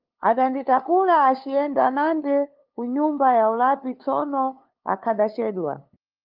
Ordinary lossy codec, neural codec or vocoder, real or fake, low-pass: Opus, 24 kbps; codec, 16 kHz, 2 kbps, FunCodec, trained on LibriTTS, 25 frames a second; fake; 5.4 kHz